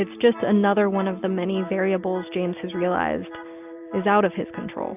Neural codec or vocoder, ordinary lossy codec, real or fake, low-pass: none; AAC, 32 kbps; real; 3.6 kHz